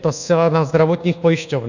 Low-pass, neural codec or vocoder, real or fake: 7.2 kHz; codec, 24 kHz, 1.2 kbps, DualCodec; fake